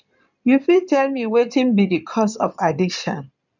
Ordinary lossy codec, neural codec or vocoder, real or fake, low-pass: none; codec, 16 kHz in and 24 kHz out, 2.2 kbps, FireRedTTS-2 codec; fake; 7.2 kHz